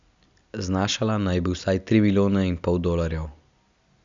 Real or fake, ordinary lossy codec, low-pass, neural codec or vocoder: real; Opus, 64 kbps; 7.2 kHz; none